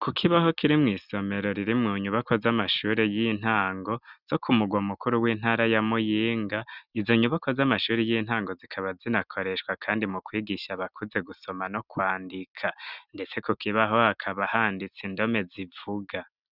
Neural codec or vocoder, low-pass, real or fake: none; 5.4 kHz; real